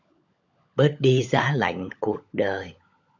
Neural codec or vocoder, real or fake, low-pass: codec, 16 kHz, 16 kbps, FunCodec, trained on LibriTTS, 50 frames a second; fake; 7.2 kHz